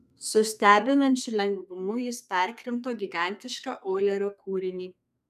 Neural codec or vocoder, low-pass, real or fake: codec, 32 kHz, 1.9 kbps, SNAC; 14.4 kHz; fake